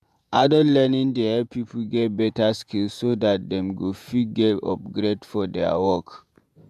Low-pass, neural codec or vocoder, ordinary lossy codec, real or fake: 14.4 kHz; vocoder, 48 kHz, 128 mel bands, Vocos; AAC, 96 kbps; fake